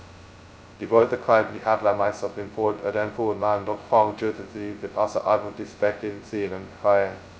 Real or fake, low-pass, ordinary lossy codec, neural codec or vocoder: fake; none; none; codec, 16 kHz, 0.2 kbps, FocalCodec